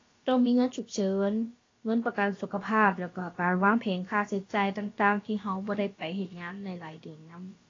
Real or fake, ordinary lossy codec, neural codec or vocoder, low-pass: fake; AAC, 32 kbps; codec, 16 kHz, about 1 kbps, DyCAST, with the encoder's durations; 7.2 kHz